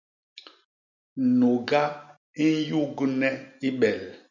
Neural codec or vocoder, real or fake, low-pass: none; real; 7.2 kHz